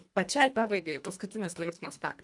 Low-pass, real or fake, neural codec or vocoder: 10.8 kHz; fake; codec, 24 kHz, 1.5 kbps, HILCodec